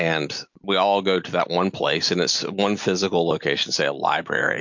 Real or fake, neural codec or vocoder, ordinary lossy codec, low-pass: real; none; MP3, 48 kbps; 7.2 kHz